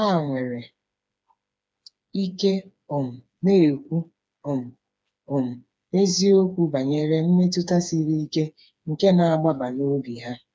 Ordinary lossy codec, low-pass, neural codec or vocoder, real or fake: none; none; codec, 16 kHz, 4 kbps, FreqCodec, smaller model; fake